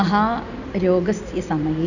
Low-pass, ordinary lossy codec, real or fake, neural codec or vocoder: 7.2 kHz; none; real; none